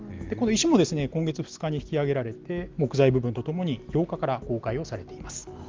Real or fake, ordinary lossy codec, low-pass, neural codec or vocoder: real; Opus, 32 kbps; 7.2 kHz; none